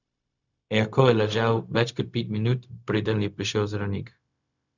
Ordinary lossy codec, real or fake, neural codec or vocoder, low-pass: none; fake; codec, 16 kHz, 0.4 kbps, LongCat-Audio-Codec; 7.2 kHz